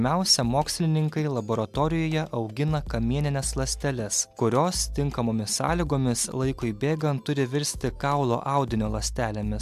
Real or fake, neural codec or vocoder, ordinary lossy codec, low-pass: real; none; AAC, 96 kbps; 14.4 kHz